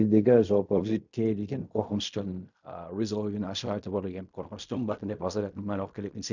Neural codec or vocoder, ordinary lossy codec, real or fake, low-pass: codec, 16 kHz in and 24 kHz out, 0.4 kbps, LongCat-Audio-Codec, fine tuned four codebook decoder; none; fake; 7.2 kHz